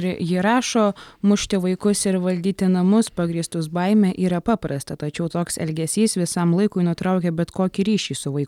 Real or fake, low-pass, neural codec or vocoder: real; 19.8 kHz; none